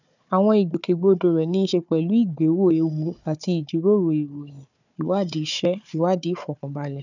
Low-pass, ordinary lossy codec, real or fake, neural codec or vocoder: 7.2 kHz; none; fake; codec, 16 kHz, 4 kbps, FunCodec, trained on Chinese and English, 50 frames a second